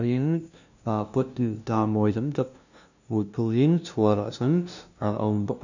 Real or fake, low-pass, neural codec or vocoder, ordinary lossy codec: fake; 7.2 kHz; codec, 16 kHz, 0.5 kbps, FunCodec, trained on LibriTTS, 25 frames a second; none